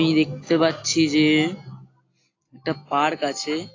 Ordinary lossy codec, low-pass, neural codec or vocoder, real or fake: AAC, 32 kbps; 7.2 kHz; none; real